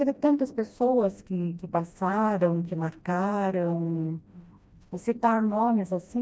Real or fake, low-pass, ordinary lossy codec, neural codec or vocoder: fake; none; none; codec, 16 kHz, 1 kbps, FreqCodec, smaller model